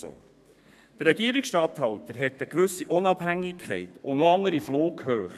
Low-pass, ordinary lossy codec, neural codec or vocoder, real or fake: 14.4 kHz; none; codec, 32 kHz, 1.9 kbps, SNAC; fake